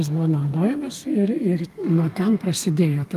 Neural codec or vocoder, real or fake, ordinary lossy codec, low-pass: codec, 32 kHz, 1.9 kbps, SNAC; fake; Opus, 32 kbps; 14.4 kHz